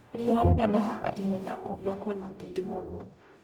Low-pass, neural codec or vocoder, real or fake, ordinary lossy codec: 19.8 kHz; codec, 44.1 kHz, 0.9 kbps, DAC; fake; Opus, 64 kbps